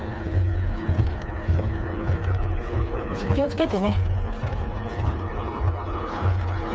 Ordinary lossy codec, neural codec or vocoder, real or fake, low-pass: none; codec, 16 kHz, 4 kbps, FreqCodec, smaller model; fake; none